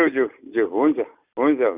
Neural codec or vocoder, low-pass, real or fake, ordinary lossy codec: none; 3.6 kHz; real; Opus, 64 kbps